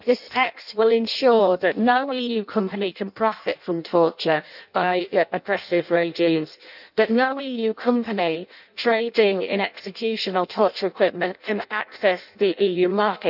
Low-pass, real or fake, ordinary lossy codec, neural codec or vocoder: 5.4 kHz; fake; none; codec, 16 kHz in and 24 kHz out, 0.6 kbps, FireRedTTS-2 codec